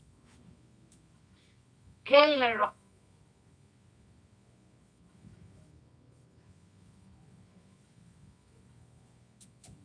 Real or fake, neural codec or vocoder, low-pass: fake; codec, 24 kHz, 0.9 kbps, WavTokenizer, medium music audio release; 9.9 kHz